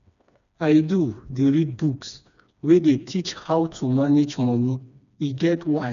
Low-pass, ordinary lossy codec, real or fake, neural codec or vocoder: 7.2 kHz; none; fake; codec, 16 kHz, 2 kbps, FreqCodec, smaller model